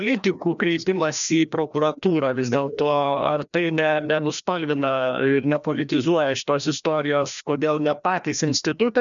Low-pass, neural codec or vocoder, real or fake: 7.2 kHz; codec, 16 kHz, 1 kbps, FreqCodec, larger model; fake